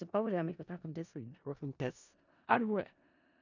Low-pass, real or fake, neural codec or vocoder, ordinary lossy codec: 7.2 kHz; fake; codec, 16 kHz in and 24 kHz out, 0.4 kbps, LongCat-Audio-Codec, four codebook decoder; none